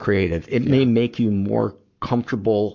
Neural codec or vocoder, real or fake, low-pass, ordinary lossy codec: codec, 44.1 kHz, 7.8 kbps, Pupu-Codec; fake; 7.2 kHz; MP3, 64 kbps